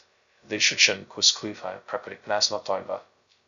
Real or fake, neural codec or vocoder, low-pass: fake; codec, 16 kHz, 0.2 kbps, FocalCodec; 7.2 kHz